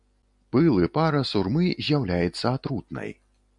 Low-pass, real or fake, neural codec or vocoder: 10.8 kHz; real; none